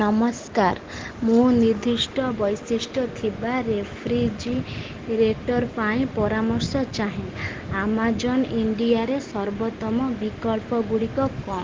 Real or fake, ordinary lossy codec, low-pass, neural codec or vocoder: real; Opus, 16 kbps; 7.2 kHz; none